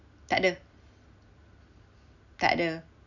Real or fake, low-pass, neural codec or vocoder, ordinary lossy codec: real; 7.2 kHz; none; none